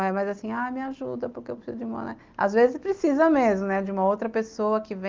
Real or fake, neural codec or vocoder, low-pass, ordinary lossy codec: real; none; 7.2 kHz; Opus, 32 kbps